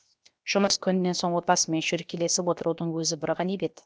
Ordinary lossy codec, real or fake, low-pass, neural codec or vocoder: none; fake; none; codec, 16 kHz, 0.7 kbps, FocalCodec